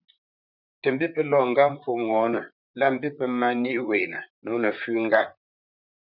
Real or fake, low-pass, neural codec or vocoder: fake; 5.4 kHz; vocoder, 44.1 kHz, 128 mel bands, Pupu-Vocoder